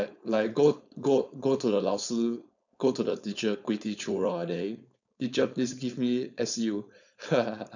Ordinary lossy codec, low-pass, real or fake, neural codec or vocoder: none; 7.2 kHz; fake; codec, 16 kHz, 4.8 kbps, FACodec